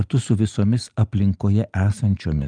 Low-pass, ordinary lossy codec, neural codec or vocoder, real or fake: 9.9 kHz; Opus, 32 kbps; none; real